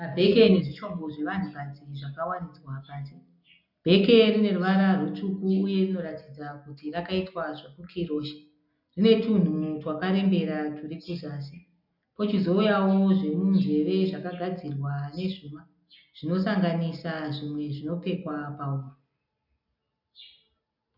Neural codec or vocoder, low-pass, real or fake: none; 5.4 kHz; real